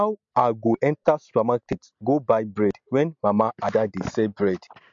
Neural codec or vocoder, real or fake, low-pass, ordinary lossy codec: none; real; 7.2 kHz; MP3, 48 kbps